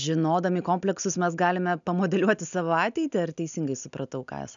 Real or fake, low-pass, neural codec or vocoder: real; 7.2 kHz; none